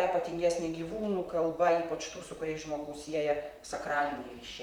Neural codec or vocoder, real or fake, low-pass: vocoder, 44.1 kHz, 128 mel bands, Pupu-Vocoder; fake; 19.8 kHz